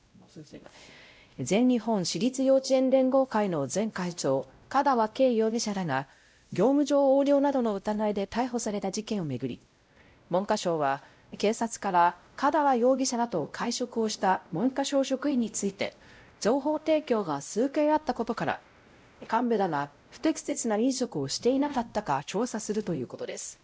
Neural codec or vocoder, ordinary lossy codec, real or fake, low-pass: codec, 16 kHz, 0.5 kbps, X-Codec, WavLM features, trained on Multilingual LibriSpeech; none; fake; none